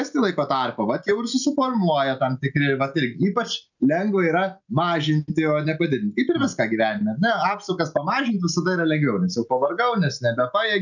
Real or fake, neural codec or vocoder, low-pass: real; none; 7.2 kHz